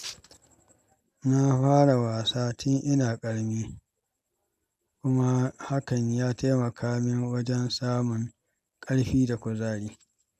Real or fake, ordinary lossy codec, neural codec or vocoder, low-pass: real; none; none; 14.4 kHz